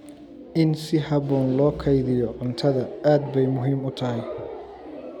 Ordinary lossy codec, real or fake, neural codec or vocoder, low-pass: none; real; none; 19.8 kHz